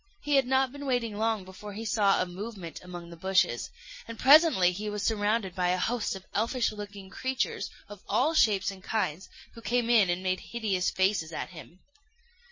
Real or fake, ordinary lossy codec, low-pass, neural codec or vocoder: real; MP3, 32 kbps; 7.2 kHz; none